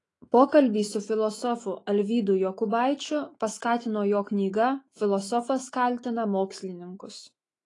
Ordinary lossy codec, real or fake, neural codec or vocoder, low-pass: AAC, 32 kbps; fake; autoencoder, 48 kHz, 128 numbers a frame, DAC-VAE, trained on Japanese speech; 10.8 kHz